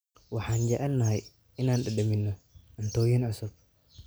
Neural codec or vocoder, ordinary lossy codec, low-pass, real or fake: none; none; none; real